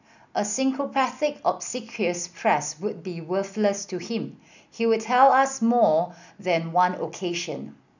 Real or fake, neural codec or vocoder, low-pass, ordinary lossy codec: real; none; 7.2 kHz; none